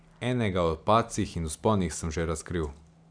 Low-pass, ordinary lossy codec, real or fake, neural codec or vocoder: 9.9 kHz; none; real; none